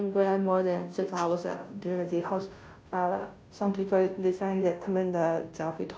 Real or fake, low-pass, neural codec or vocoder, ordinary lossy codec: fake; none; codec, 16 kHz, 0.5 kbps, FunCodec, trained on Chinese and English, 25 frames a second; none